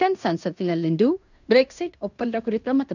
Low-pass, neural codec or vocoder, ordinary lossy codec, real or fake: 7.2 kHz; codec, 16 kHz in and 24 kHz out, 0.9 kbps, LongCat-Audio-Codec, fine tuned four codebook decoder; none; fake